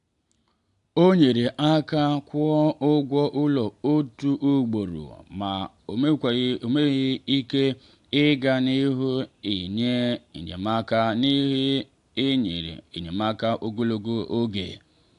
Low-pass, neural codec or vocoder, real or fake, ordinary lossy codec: 10.8 kHz; none; real; AAC, 64 kbps